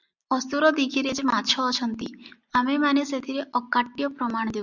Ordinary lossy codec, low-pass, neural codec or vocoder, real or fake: Opus, 64 kbps; 7.2 kHz; none; real